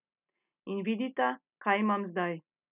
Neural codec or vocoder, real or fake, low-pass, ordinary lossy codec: none; real; 3.6 kHz; none